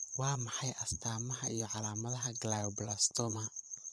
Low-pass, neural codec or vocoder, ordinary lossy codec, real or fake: none; vocoder, 22.05 kHz, 80 mel bands, Vocos; none; fake